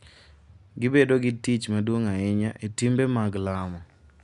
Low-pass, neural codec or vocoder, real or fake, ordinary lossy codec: 10.8 kHz; none; real; none